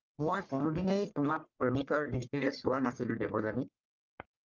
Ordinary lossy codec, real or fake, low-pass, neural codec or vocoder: Opus, 24 kbps; fake; 7.2 kHz; codec, 44.1 kHz, 1.7 kbps, Pupu-Codec